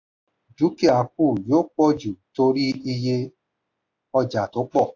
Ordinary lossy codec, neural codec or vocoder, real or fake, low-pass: AAC, 48 kbps; none; real; 7.2 kHz